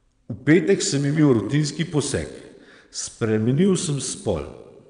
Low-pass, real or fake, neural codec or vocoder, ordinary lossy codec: 9.9 kHz; fake; vocoder, 22.05 kHz, 80 mel bands, Vocos; none